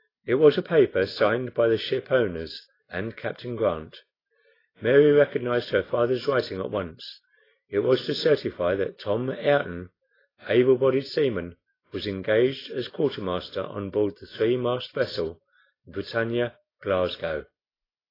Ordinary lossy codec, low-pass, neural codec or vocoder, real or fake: AAC, 24 kbps; 5.4 kHz; none; real